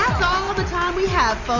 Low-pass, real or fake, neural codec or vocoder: 7.2 kHz; real; none